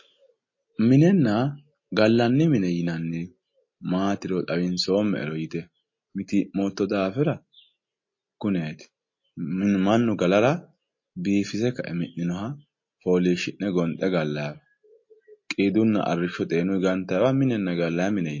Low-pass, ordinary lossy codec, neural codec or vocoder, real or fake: 7.2 kHz; MP3, 32 kbps; none; real